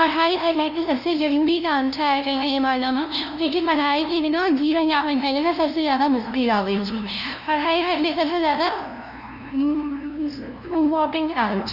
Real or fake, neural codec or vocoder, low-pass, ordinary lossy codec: fake; codec, 16 kHz, 0.5 kbps, FunCodec, trained on LibriTTS, 25 frames a second; 5.4 kHz; none